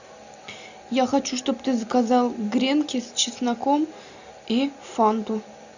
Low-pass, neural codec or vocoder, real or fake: 7.2 kHz; none; real